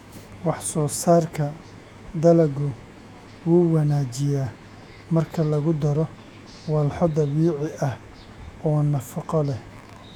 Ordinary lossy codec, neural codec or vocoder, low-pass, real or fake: none; autoencoder, 48 kHz, 128 numbers a frame, DAC-VAE, trained on Japanese speech; 19.8 kHz; fake